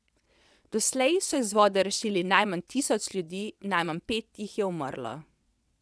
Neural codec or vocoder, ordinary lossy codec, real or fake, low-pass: vocoder, 22.05 kHz, 80 mel bands, WaveNeXt; none; fake; none